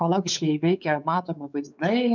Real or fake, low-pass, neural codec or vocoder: fake; 7.2 kHz; codec, 16 kHz, 4 kbps, X-Codec, WavLM features, trained on Multilingual LibriSpeech